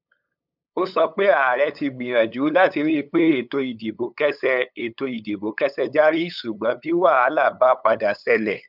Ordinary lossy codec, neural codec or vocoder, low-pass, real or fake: none; codec, 16 kHz, 8 kbps, FunCodec, trained on LibriTTS, 25 frames a second; 5.4 kHz; fake